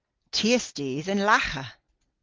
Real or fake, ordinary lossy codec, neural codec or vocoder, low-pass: real; Opus, 16 kbps; none; 7.2 kHz